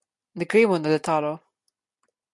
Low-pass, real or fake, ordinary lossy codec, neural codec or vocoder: 10.8 kHz; real; MP3, 64 kbps; none